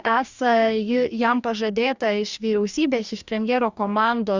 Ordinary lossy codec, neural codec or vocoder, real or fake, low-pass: Opus, 64 kbps; codec, 44.1 kHz, 2.6 kbps, DAC; fake; 7.2 kHz